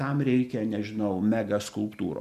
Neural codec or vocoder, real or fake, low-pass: none; real; 14.4 kHz